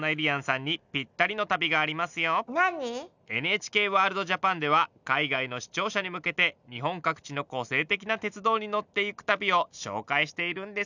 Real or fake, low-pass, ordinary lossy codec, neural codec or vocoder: real; 7.2 kHz; none; none